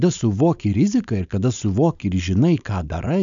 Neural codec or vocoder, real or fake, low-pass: codec, 16 kHz, 4.8 kbps, FACodec; fake; 7.2 kHz